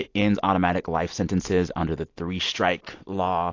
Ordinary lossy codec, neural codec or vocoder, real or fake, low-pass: AAC, 48 kbps; none; real; 7.2 kHz